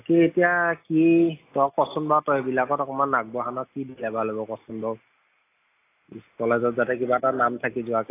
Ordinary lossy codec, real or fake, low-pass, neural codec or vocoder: AAC, 24 kbps; real; 3.6 kHz; none